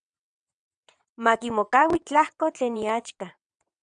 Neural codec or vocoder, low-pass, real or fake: vocoder, 22.05 kHz, 80 mel bands, WaveNeXt; 9.9 kHz; fake